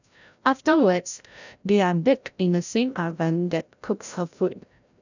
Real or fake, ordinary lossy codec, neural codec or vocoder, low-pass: fake; none; codec, 16 kHz, 0.5 kbps, FreqCodec, larger model; 7.2 kHz